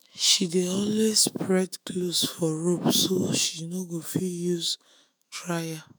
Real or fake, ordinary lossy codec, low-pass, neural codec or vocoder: fake; none; none; autoencoder, 48 kHz, 128 numbers a frame, DAC-VAE, trained on Japanese speech